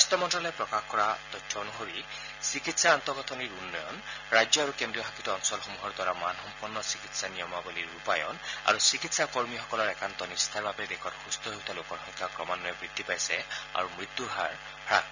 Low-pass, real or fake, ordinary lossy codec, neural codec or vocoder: 7.2 kHz; real; none; none